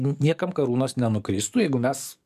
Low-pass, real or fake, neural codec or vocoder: 14.4 kHz; fake; codec, 44.1 kHz, 7.8 kbps, DAC